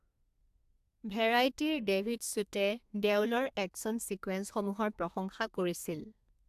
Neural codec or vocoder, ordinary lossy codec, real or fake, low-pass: codec, 32 kHz, 1.9 kbps, SNAC; AAC, 96 kbps; fake; 14.4 kHz